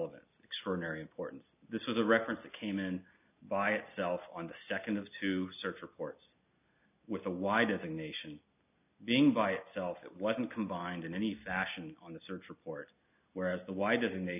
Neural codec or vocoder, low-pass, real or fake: none; 3.6 kHz; real